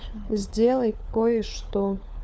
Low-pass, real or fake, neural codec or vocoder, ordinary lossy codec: none; fake; codec, 16 kHz, 4 kbps, FunCodec, trained on LibriTTS, 50 frames a second; none